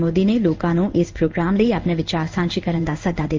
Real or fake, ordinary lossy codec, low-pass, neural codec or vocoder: fake; Opus, 24 kbps; 7.2 kHz; codec, 16 kHz in and 24 kHz out, 1 kbps, XY-Tokenizer